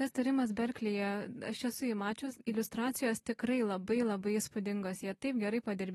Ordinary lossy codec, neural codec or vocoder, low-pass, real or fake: AAC, 32 kbps; none; 19.8 kHz; real